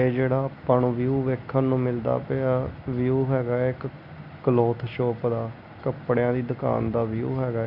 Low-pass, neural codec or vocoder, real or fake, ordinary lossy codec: 5.4 kHz; none; real; none